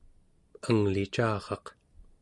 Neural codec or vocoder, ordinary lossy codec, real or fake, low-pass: none; Opus, 64 kbps; real; 10.8 kHz